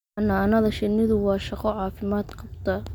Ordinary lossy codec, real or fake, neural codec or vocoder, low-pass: none; real; none; 19.8 kHz